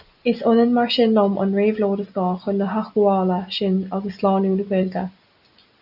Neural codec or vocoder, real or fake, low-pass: none; real; 5.4 kHz